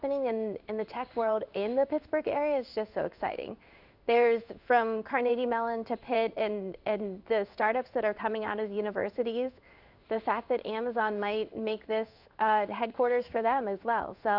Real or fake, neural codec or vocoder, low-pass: fake; codec, 16 kHz in and 24 kHz out, 1 kbps, XY-Tokenizer; 5.4 kHz